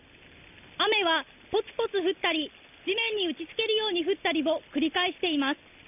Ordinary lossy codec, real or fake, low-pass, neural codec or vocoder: none; real; 3.6 kHz; none